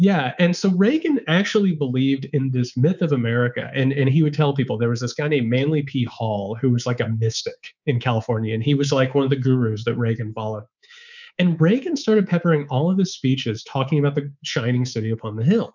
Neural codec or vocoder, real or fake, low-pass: codec, 24 kHz, 3.1 kbps, DualCodec; fake; 7.2 kHz